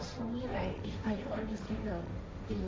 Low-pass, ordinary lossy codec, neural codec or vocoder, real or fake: none; none; codec, 16 kHz, 1.1 kbps, Voila-Tokenizer; fake